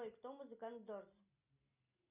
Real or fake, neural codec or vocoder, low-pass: real; none; 3.6 kHz